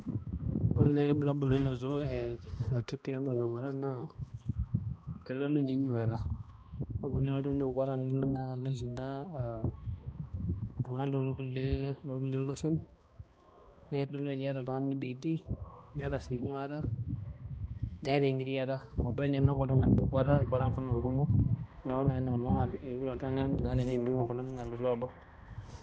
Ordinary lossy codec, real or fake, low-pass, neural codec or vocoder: none; fake; none; codec, 16 kHz, 1 kbps, X-Codec, HuBERT features, trained on balanced general audio